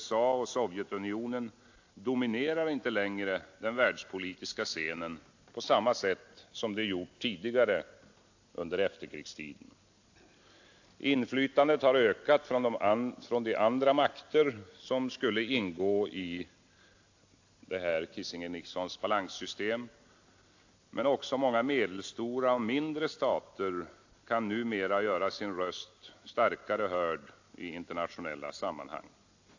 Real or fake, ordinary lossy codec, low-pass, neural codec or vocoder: real; AAC, 48 kbps; 7.2 kHz; none